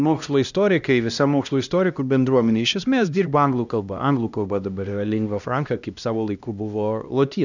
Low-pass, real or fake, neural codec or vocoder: 7.2 kHz; fake; codec, 16 kHz, 1 kbps, X-Codec, HuBERT features, trained on LibriSpeech